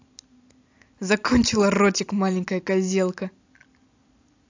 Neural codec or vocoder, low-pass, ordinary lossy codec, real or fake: none; 7.2 kHz; AAC, 48 kbps; real